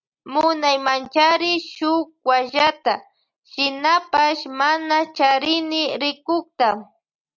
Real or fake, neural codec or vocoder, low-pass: real; none; 7.2 kHz